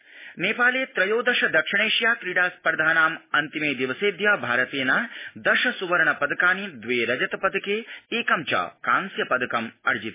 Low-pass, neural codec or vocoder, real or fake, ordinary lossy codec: 3.6 kHz; none; real; MP3, 16 kbps